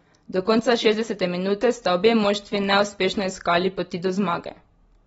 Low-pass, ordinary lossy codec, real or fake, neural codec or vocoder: 19.8 kHz; AAC, 24 kbps; real; none